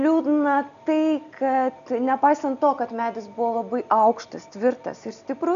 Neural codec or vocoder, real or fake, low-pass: none; real; 7.2 kHz